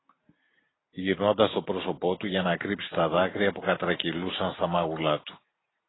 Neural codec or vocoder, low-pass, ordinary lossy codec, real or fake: none; 7.2 kHz; AAC, 16 kbps; real